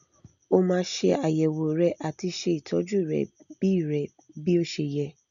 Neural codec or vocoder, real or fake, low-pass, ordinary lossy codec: none; real; 7.2 kHz; none